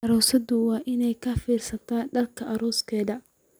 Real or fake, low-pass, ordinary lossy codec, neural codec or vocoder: real; none; none; none